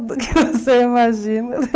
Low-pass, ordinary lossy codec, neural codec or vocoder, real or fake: none; none; codec, 16 kHz, 8 kbps, FunCodec, trained on Chinese and English, 25 frames a second; fake